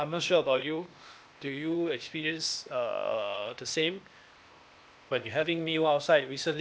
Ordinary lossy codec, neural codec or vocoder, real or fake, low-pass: none; codec, 16 kHz, 0.8 kbps, ZipCodec; fake; none